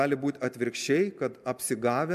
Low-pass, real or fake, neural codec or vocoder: 14.4 kHz; real; none